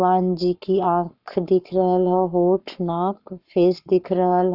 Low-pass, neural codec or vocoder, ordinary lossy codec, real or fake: 5.4 kHz; codec, 44.1 kHz, 7.8 kbps, Pupu-Codec; none; fake